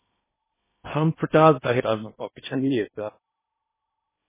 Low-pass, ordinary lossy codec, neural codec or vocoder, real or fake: 3.6 kHz; MP3, 16 kbps; codec, 16 kHz in and 24 kHz out, 0.8 kbps, FocalCodec, streaming, 65536 codes; fake